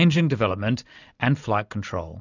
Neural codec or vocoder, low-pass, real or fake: none; 7.2 kHz; real